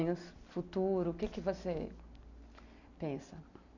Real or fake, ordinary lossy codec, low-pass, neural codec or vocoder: real; none; 7.2 kHz; none